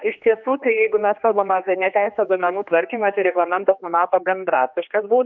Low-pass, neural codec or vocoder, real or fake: 7.2 kHz; codec, 16 kHz, 2 kbps, X-Codec, HuBERT features, trained on general audio; fake